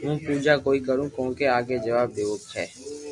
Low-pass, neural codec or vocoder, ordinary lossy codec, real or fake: 10.8 kHz; none; MP3, 96 kbps; real